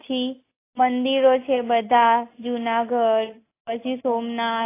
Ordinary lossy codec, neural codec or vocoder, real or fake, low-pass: AAC, 24 kbps; none; real; 3.6 kHz